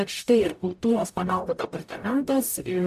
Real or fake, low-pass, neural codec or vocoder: fake; 14.4 kHz; codec, 44.1 kHz, 0.9 kbps, DAC